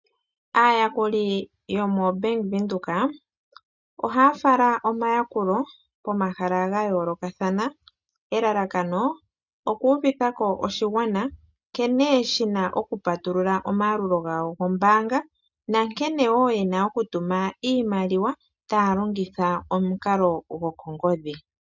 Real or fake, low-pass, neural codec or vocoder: real; 7.2 kHz; none